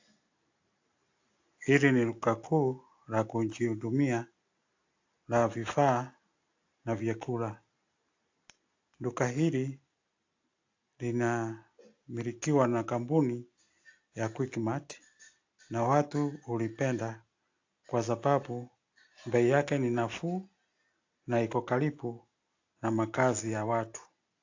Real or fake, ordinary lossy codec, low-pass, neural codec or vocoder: real; AAC, 48 kbps; 7.2 kHz; none